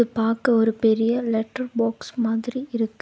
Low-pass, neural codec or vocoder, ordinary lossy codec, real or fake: none; none; none; real